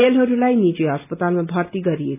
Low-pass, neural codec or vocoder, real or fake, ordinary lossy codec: 3.6 kHz; none; real; none